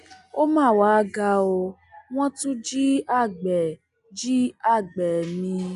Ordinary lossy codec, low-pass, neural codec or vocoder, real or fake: none; 10.8 kHz; none; real